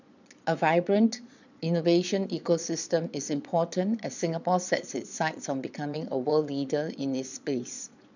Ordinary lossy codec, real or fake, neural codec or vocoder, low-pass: none; fake; vocoder, 22.05 kHz, 80 mel bands, Vocos; 7.2 kHz